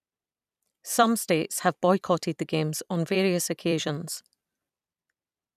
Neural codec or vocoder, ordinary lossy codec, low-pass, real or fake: vocoder, 44.1 kHz, 128 mel bands every 256 samples, BigVGAN v2; none; 14.4 kHz; fake